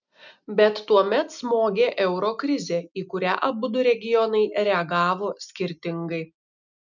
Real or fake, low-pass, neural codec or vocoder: real; 7.2 kHz; none